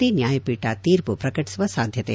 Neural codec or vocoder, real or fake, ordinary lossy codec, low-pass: none; real; none; none